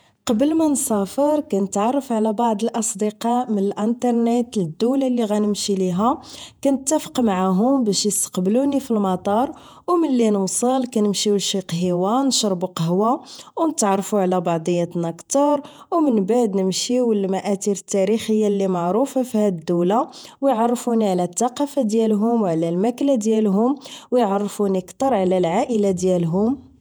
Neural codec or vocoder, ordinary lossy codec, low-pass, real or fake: vocoder, 48 kHz, 128 mel bands, Vocos; none; none; fake